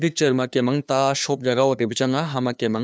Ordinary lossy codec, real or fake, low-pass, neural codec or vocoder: none; fake; none; codec, 16 kHz, 2 kbps, FunCodec, trained on LibriTTS, 25 frames a second